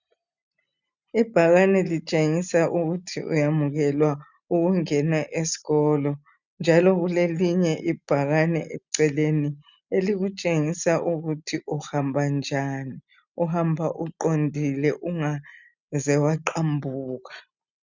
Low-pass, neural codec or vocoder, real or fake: 7.2 kHz; none; real